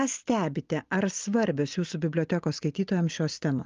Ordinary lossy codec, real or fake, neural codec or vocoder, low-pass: Opus, 32 kbps; real; none; 7.2 kHz